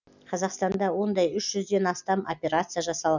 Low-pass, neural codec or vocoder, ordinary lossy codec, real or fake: 7.2 kHz; none; none; real